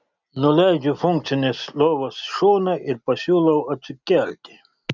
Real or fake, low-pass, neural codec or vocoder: real; 7.2 kHz; none